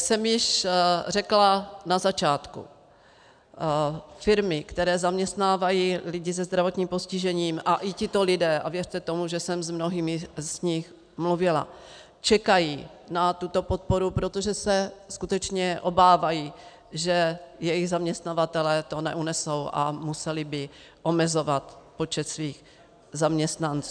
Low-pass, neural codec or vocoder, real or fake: 9.9 kHz; none; real